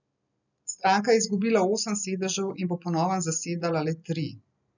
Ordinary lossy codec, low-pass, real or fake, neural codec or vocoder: none; 7.2 kHz; real; none